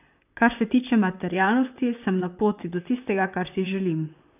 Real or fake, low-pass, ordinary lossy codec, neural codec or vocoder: fake; 3.6 kHz; none; vocoder, 44.1 kHz, 128 mel bands, Pupu-Vocoder